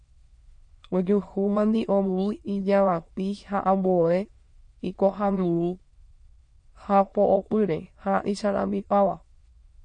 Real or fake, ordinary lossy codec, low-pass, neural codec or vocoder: fake; MP3, 48 kbps; 9.9 kHz; autoencoder, 22.05 kHz, a latent of 192 numbers a frame, VITS, trained on many speakers